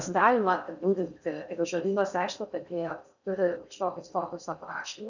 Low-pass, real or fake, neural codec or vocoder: 7.2 kHz; fake; codec, 16 kHz in and 24 kHz out, 0.6 kbps, FocalCodec, streaming, 4096 codes